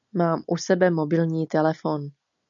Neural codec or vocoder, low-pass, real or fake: none; 7.2 kHz; real